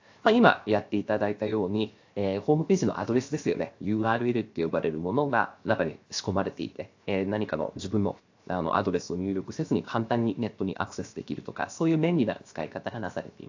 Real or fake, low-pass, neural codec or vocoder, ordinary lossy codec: fake; 7.2 kHz; codec, 16 kHz, 0.7 kbps, FocalCodec; AAC, 48 kbps